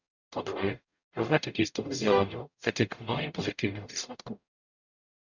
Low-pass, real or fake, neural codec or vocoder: 7.2 kHz; fake; codec, 44.1 kHz, 0.9 kbps, DAC